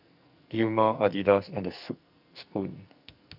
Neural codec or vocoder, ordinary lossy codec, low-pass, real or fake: codec, 44.1 kHz, 2.6 kbps, SNAC; none; 5.4 kHz; fake